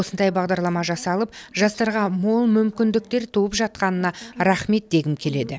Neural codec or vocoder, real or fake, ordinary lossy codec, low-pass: none; real; none; none